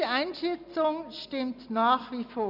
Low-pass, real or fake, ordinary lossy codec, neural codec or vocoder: 5.4 kHz; real; none; none